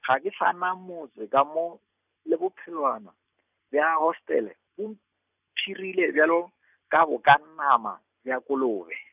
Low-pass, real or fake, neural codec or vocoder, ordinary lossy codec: 3.6 kHz; real; none; none